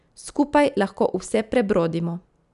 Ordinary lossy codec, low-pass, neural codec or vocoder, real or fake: none; 10.8 kHz; none; real